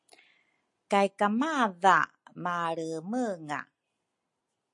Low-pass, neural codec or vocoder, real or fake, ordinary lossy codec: 10.8 kHz; none; real; MP3, 48 kbps